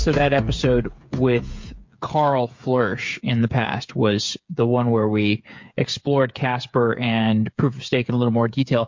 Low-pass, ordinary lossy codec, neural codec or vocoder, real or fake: 7.2 kHz; MP3, 48 kbps; codec, 16 kHz, 8 kbps, FreqCodec, smaller model; fake